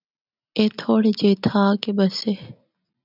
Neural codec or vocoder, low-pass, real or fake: none; 5.4 kHz; real